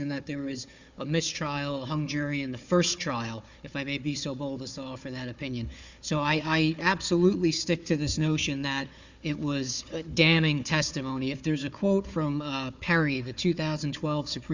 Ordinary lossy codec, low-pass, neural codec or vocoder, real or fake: Opus, 64 kbps; 7.2 kHz; codec, 16 kHz, 4 kbps, FunCodec, trained on Chinese and English, 50 frames a second; fake